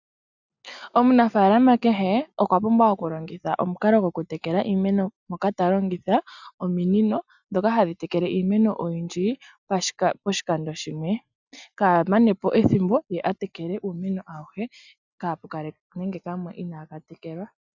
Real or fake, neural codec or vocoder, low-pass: real; none; 7.2 kHz